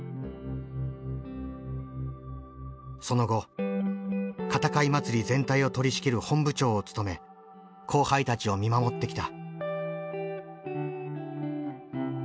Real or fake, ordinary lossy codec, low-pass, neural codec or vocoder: real; none; none; none